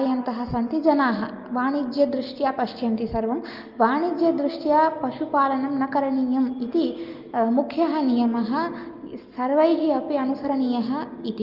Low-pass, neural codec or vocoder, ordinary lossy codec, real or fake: 5.4 kHz; none; Opus, 24 kbps; real